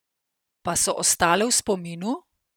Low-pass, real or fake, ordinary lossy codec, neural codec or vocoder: none; fake; none; vocoder, 44.1 kHz, 128 mel bands every 512 samples, BigVGAN v2